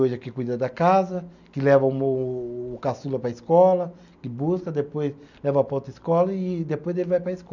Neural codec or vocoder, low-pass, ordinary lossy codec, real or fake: none; 7.2 kHz; none; real